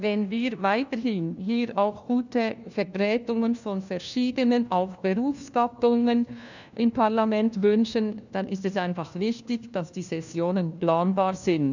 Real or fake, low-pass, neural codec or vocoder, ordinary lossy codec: fake; 7.2 kHz; codec, 16 kHz, 1 kbps, FunCodec, trained on LibriTTS, 50 frames a second; none